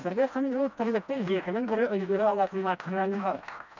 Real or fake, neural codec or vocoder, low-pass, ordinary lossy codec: fake; codec, 16 kHz, 1 kbps, FreqCodec, smaller model; 7.2 kHz; none